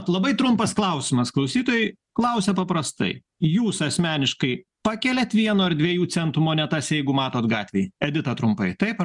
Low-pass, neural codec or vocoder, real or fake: 10.8 kHz; none; real